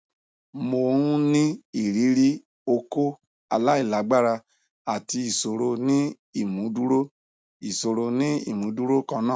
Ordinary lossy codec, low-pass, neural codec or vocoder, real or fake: none; none; none; real